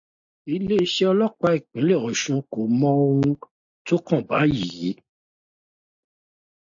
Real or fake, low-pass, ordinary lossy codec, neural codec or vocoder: real; 7.2 kHz; AAC, 64 kbps; none